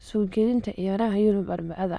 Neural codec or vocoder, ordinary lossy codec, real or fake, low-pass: autoencoder, 22.05 kHz, a latent of 192 numbers a frame, VITS, trained on many speakers; none; fake; none